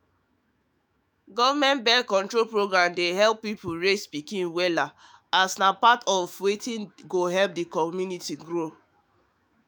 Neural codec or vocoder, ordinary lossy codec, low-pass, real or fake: autoencoder, 48 kHz, 128 numbers a frame, DAC-VAE, trained on Japanese speech; none; none; fake